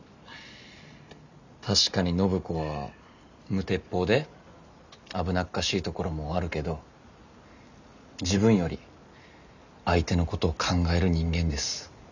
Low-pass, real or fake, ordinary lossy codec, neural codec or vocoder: 7.2 kHz; real; none; none